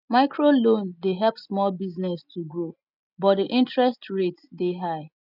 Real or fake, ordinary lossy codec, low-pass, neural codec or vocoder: real; none; 5.4 kHz; none